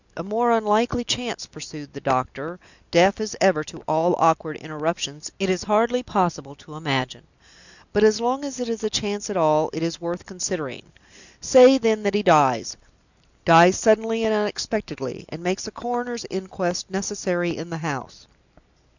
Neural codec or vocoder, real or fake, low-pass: none; real; 7.2 kHz